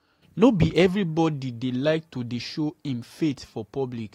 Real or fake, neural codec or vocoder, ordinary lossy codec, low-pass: real; none; AAC, 48 kbps; 19.8 kHz